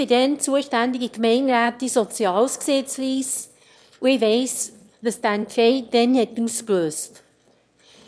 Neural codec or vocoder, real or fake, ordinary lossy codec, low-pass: autoencoder, 22.05 kHz, a latent of 192 numbers a frame, VITS, trained on one speaker; fake; none; none